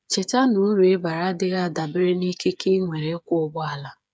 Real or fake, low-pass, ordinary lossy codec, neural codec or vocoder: fake; none; none; codec, 16 kHz, 8 kbps, FreqCodec, smaller model